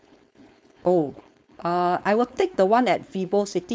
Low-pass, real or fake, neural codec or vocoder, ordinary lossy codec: none; fake; codec, 16 kHz, 4.8 kbps, FACodec; none